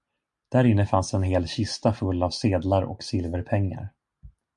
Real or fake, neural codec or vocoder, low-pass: real; none; 10.8 kHz